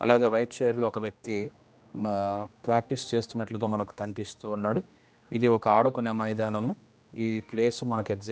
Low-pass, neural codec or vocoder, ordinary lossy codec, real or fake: none; codec, 16 kHz, 1 kbps, X-Codec, HuBERT features, trained on general audio; none; fake